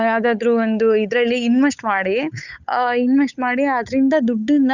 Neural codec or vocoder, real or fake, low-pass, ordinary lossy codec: codec, 16 kHz, 8 kbps, FunCodec, trained on Chinese and English, 25 frames a second; fake; 7.2 kHz; none